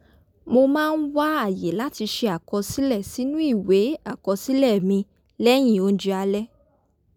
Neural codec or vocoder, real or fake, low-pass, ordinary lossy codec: none; real; 19.8 kHz; none